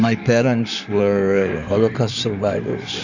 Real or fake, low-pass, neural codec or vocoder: fake; 7.2 kHz; codec, 16 kHz in and 24 kHz out, 2.2 kbps, FireRedTTS-2 codec